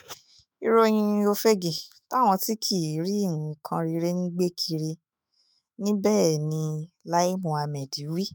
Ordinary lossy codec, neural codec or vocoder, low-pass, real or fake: none; autoencoder, 48 kHz, 128 numbers a frame, DAC-VAE, trained on Japanese speech; none; fake